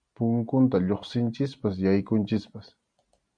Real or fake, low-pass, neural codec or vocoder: real; 9.9 kHz; none